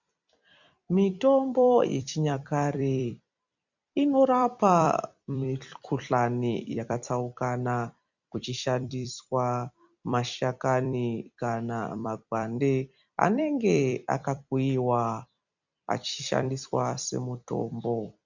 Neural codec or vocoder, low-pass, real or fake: vocoder, 44.1 kHz, 128 mel bands every 256 samples, BigVGAN v2; 7.2 kHz; fake